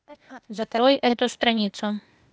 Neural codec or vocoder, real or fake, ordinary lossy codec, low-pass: codec, 16 kHz, 0.8 kbps, ZipCodec; fake; none; none